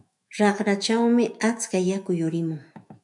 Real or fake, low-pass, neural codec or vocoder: fake; 10.8 kHz; autoencoder, 48 kHz, 128 numbers a frame, DAC-VAE, trained on Japanese speech